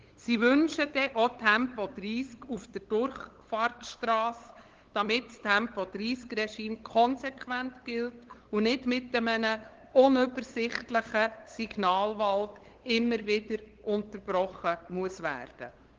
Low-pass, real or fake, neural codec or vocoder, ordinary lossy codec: 7.2 kHz; fake; codec, 16 kHz, 8 kbps, FunCodec, trained on LibriTTS, 25 frames a second; Opus, 16 kbps